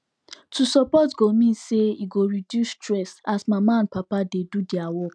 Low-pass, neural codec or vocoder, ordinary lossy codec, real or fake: none; none; none; real